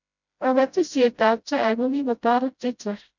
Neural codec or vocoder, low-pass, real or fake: codec, 16 kHz, 0.5 kbps, FreqCodec, smaller model; 7.2 kHz; fake